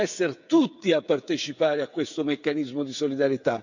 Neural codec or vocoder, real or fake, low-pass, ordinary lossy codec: codec, 16 kHz, 8 kbps, FreqCodec, smaller model; fake; 7.2 kHz; none